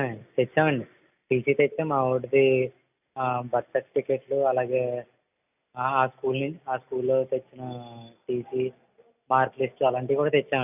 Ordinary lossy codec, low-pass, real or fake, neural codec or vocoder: none; 3.6 kHz; real; none